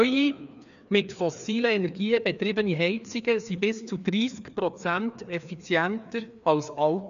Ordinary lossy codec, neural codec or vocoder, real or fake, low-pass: none; codec, 16 kHz, 2 kbps, FreqCodec, larger model; fake; 7.2 kHz